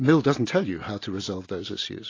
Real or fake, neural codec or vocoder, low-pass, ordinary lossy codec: real; none; 7.2 kHz; AAC, 32 kbps